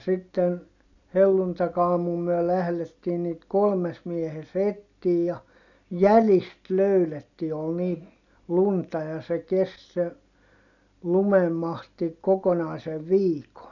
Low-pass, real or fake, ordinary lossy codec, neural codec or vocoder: 7.2 kHz; real; none; none